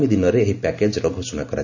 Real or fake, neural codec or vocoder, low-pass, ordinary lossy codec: real; none; 7.2 kHz; none